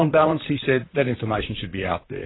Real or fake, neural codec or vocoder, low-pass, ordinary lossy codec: fake; vocoder, 44.1 kHz, 128 mel bands, Pupu-Vocoder; 7.2 kHz; AAC, 16 kbps